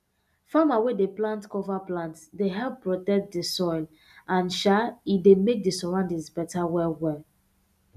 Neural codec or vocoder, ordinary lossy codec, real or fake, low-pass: none; none; real; 14.4 kHz